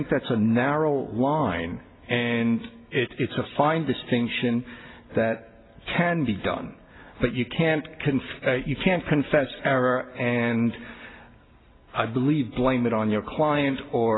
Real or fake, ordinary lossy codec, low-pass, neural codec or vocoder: real; AAC, 16 kbps; 7.2 kHz; none